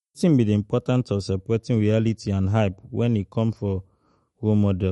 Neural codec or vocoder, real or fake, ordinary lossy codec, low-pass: autoencoder, 48 kHz, 128 numbers a frame, DAC-VAE, trained on Japanese speech; fake; MP3, 48 kbps; 19.8 kHz